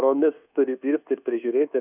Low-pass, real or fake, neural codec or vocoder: 3.6 kHz; fake; codec, 24 kHz, 1.2 kbps, DualCodec